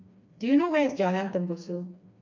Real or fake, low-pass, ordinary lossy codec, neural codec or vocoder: fake; 7.2 kHz; MP3, 64 kbps; codec, 16 kHz, 2 kbps, FreqCodec, smaller model